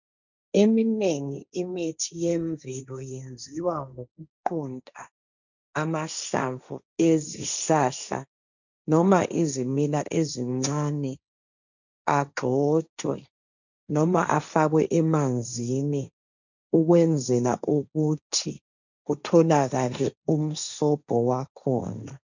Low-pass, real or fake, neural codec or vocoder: 7.2 kHz; fake; codec, 16 kHz, 1.1 kbps, Voila-Tokenizer